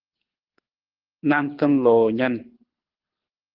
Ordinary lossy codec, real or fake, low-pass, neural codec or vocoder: Opus, 16 kbps; fake; 5.4 kHz; codec, 24 kHz, 6 kbps, HILCodec